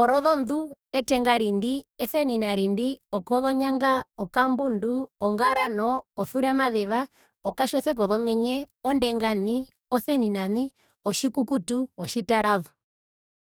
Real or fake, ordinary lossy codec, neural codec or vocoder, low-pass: fake; none; codec, 44.1 kHz, 2.6 kbps, DAC; none